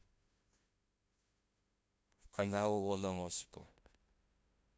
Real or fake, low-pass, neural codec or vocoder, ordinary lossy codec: fake; none; codec, 16 kHz, 0.5 kbps, FunCodec, trained on LibriTTS, 25 frames a second; none